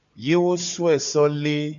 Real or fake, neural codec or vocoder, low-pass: fake; codec, 16 kHz, 4 kbps, FunCodec, trained on Chinese and English, 50 frames a second; 7.2 kHz